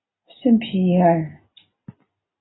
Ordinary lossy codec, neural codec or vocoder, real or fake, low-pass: AAC, 16 kbps; none; real; 7.2 kHz